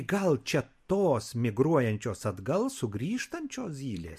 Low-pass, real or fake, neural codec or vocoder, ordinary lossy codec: 14.4 kHz; real; none; MP3, 64 kbps